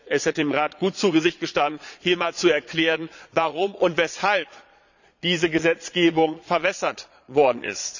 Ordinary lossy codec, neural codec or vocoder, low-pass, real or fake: none; vocoder, 22.05 kHz, 80 mel bands, Vocos; 7.2 kHz; fake